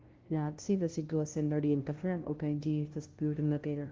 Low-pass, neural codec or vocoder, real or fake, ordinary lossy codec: 7.2 kHz; codec, 16 kHz, 0.5 kbps, FunCodec, trained on LibriTTS, 25 frames a second; fake; Opus, 16 kbps